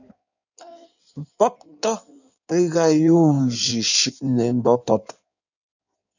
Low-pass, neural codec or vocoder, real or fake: 7.2 kHz; codec, 16 kHz in and 24 kHz out, 1.1 kbps, FireRedTTS-2 codec; fake